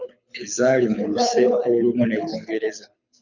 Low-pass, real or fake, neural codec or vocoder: 7.2 kHz; fake; codec, 24 kHz, 6 kbps, HILCodec